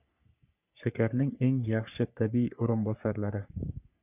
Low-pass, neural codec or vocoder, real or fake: 3.6 kHz; codec, 44.1 kHz, 3.4 kbps, Pupu-Codec; fake